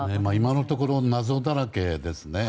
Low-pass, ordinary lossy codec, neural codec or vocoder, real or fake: none; none; none; real